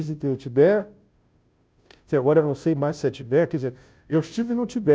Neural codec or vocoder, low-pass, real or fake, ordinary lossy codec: codec, 16 kHz, 0.5 kbps, FunCodec, trained on Chinese and English, 25 frames a second; none; fake; none